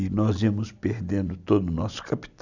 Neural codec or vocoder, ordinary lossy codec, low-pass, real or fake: none; none; 7.2 kHz; real